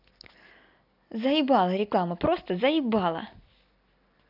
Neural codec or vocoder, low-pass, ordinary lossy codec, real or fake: none; 5.4 kHz; none; real